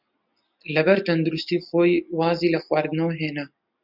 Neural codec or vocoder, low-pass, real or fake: none; 5.4 kHz; real